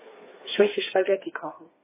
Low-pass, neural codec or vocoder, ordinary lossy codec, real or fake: 3.6 kHz; codec, 16 kHz, 4 kbps, FreqCodec, smaller model; MP3, 16 kbps; fake